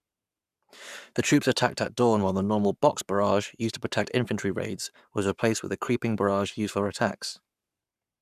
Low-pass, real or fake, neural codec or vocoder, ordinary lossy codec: 14.4 kHz; fake; codec, 44.1 kHz, 7.8 kbps, Pupu-Codec; none